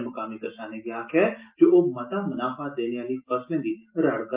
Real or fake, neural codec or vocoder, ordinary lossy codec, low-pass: real; none; Opus, 32 kbps; 3.6 kHz